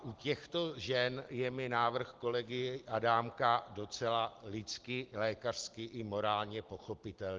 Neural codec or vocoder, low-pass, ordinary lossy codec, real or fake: none; 7.2 kHz; Opus, 16 kbps; real